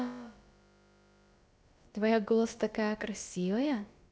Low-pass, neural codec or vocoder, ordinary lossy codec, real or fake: none; codec, 16 kHz, about 1 kbps, DyCAST, with the encoder's durations; none; fake